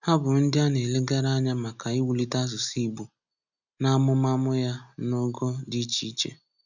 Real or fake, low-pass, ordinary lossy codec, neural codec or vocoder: real; 7.2 kHz; none; none